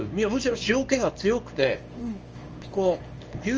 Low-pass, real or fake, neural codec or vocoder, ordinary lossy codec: 7.2 kHz; fake; codec, 24 kHz, 0.9 kbps, WavTokenizer, small release; Opus, 24 kbps